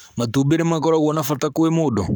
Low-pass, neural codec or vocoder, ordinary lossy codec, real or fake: 19.8 kHz; codec, 44.1 kHz, 7.8 kbps, Pupu-Codec; none; fake